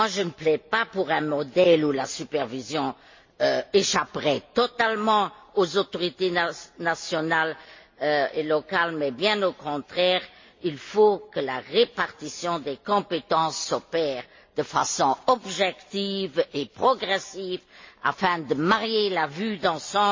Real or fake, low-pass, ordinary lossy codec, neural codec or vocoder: real; 7.2 kHz; MP3, 32 kbps; none